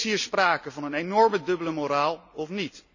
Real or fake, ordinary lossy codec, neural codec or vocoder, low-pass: real; none; none; 7.2 kHz